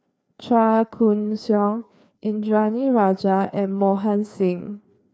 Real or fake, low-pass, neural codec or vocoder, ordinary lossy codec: fake; none; codec, 16 kHz, 2 kbps, FreqCodec, larger model; none